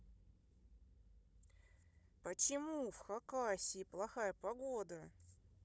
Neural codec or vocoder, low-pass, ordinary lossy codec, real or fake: codec, 16 kHz, 16 kbps, FunCodec, trained on Chinese and English, 50 frames a second; none; none; fake